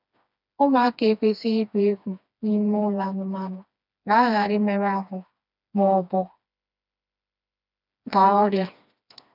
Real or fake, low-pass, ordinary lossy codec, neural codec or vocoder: fake; 5.4 kHz; none; codec, 16 kHz, 2 kbps, FreqCodec, smaller model